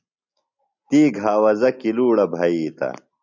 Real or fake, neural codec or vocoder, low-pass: real; none; 7.2 kHz